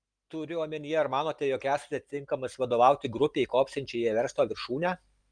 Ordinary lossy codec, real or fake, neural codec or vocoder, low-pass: Opus, 32 kbps; real; none; 9.9 kHz